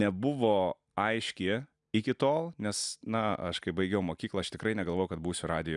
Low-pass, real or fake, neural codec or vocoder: 10.8 kHz; real; none